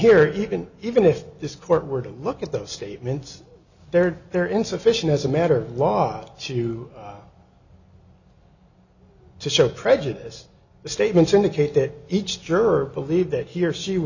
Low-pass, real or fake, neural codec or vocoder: 7.2 kHz; real; none